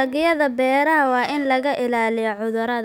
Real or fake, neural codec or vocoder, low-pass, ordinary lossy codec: fake; autoencoder, 48 kHz, 128 numbers a frame, DAC-VAE, trained on Japanese speech; 19.8 kHz; none